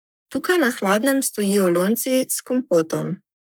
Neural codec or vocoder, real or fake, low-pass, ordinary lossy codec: codec, 44.1 kHz, 3.4 kbps, Pupu-Codec; fake; none; none